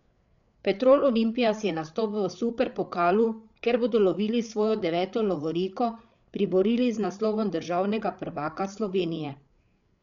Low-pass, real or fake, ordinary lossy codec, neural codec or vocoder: 7.2 kHz; fake; none; codec, 16 kHz, 8 kbps, FreqCodec, larger model